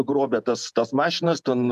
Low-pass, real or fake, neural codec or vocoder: 14.4 kHz; fake; vocoder, 48 kHz, 128 mel bands, Vocos